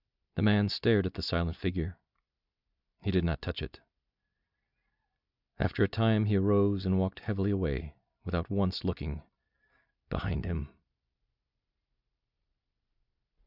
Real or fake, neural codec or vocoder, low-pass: fake; vocoder, 44.1 kHz, 128 mel bands every 256 samples, BigVGAN v2; 5.4 kHz